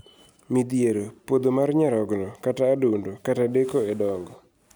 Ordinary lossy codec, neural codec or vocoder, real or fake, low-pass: none; none; real; none